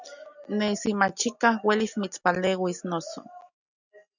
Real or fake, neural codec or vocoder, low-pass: real; none; 7.2 kHz